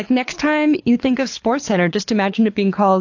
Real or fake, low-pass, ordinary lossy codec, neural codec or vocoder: fake; 7.2 kHz; AAC, 48 kbps; codec, 24 kHz, 6 kbps, HILCodec